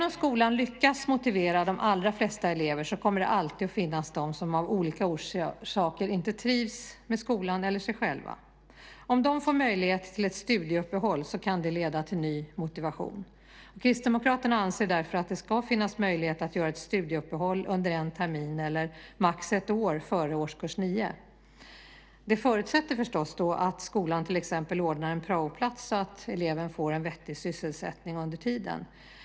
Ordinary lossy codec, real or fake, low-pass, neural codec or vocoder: none; real; none; none